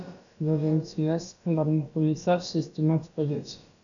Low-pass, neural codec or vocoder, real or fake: 7.2 kHz; codec, 16 kHz, about 1 kbps, DyCAST, with the encoder's durations; fake